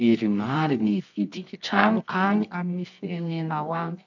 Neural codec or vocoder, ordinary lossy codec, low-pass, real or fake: codec, 24 kHz, 0.9 kbps, WavTokenizer, medium music audio release; none; 7.2 kHz; fake